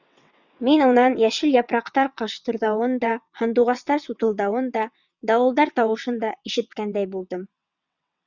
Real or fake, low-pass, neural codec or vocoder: fake; 7.2 kHz; vocoder, 22.05 kHz, 80 mel bands, WaveNeXt